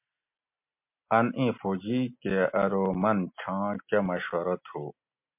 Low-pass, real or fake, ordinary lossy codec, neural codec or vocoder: 3.6 kHz; real; MP3, 32 kbps; none